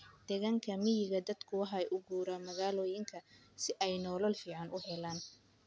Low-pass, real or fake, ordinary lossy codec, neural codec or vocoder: none; real; none; none